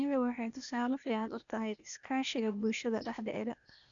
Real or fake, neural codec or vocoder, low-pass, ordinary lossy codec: fake; codec, 16 kHz, 0.8 kbps, ZipCodec; 7.2 kHz; none